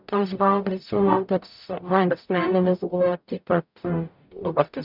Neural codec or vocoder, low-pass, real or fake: codec, 44.1 kHz, 0.9 kbps, DAC; 5.4 kHz; fake